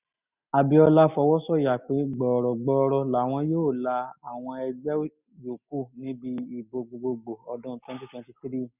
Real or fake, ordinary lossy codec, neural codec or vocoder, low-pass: real; none; none; 3.6 kHz